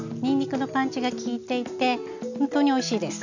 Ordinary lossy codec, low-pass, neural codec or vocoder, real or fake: none; 7.2 kHz; none; real